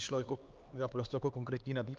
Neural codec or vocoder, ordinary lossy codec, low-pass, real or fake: codec, 16 kHz, 4 kbps, X-Codec, HuBERT features, trained on LibriSpeech; Opus, 32 kbps; 7.2 kHz; fake